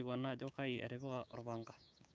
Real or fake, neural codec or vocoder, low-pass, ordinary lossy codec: fake; codec, 16 kHz, 6 kbps, DAC; none; none